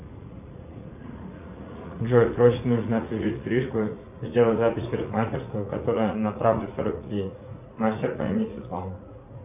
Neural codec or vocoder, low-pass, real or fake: vocoder, 44.1 kHz, 80 mel bands, Vocos; 3.6 kHz; fake